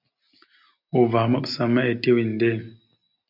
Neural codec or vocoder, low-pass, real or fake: none; 5.4 kHz; real